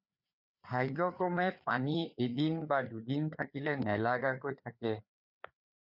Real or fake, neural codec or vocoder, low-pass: fake; codec, 16 kHz, 4 kbps, FreqCodec, larger model; 5.4 kHz